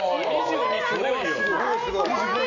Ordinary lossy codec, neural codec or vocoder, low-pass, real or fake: none; none; 7.2 kHz; real